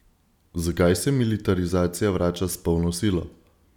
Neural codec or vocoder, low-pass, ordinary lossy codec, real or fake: none; 19.8 kHz; none; real